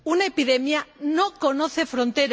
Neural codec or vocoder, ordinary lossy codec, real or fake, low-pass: none; none; real; none